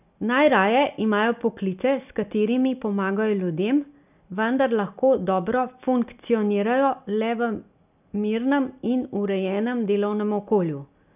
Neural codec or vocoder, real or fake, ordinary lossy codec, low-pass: none; real; none; 3.6 kHz